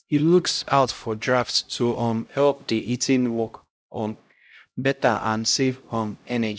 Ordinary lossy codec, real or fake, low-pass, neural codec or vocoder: none; fake; none; codec, 16 kHz, 0.5 kbps, X-Codec, HuBERT features, trained on LibriSpeech